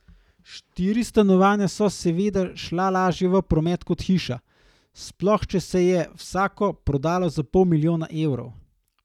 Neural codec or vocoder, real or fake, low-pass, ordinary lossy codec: none; real; 19.8 kHz; none